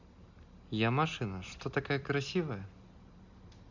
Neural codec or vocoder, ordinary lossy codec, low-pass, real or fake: none; none; 7.2 kHz; real